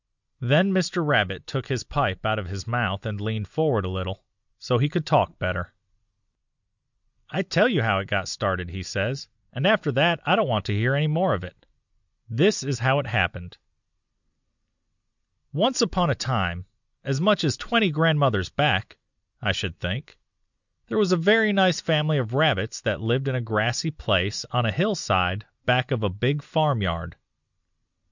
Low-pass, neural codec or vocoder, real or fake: 7.2 kHz; none; real